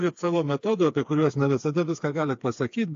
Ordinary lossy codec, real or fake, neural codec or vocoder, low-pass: AAC, 48 kbps; fake; codec, 16 kHz, 4 kbps, FreqCodec, smaller model; 7.2 kHz